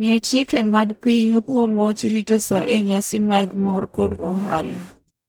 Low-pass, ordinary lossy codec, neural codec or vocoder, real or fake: none; none; codec, 44.1 kHz, 0.9 kbps, DAC; fake